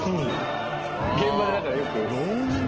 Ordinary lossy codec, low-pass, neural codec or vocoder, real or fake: Opus, 16 kbps; 7.2 kHz; none; real